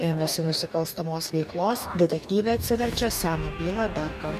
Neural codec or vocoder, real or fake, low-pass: codec, 44.1 kHz, 2.6 kbps, DAC; fake; 14.4 kHz